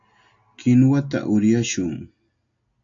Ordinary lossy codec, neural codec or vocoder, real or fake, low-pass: AAC, 64 kbps; none; real; 7.2 kHz